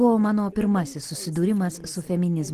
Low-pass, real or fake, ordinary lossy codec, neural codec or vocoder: 14.4 kHz; real; Opus, 16 kbps; none